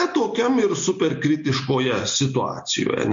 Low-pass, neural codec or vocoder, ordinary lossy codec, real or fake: 7.2 kHz; none; MP3, 48 kbps; real